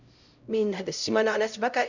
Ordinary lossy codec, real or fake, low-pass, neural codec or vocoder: none; fake; 7.2 kHz; codec, 16 kHz, 0.5 kbps, X-Codec, HuBERT features, trained on LibriSpeech